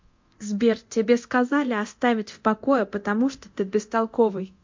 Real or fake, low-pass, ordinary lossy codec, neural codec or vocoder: fake; 7.2 kHz; MP3, 48 kbps; codec, 24 kHz, 0.9 kbps, DualCodec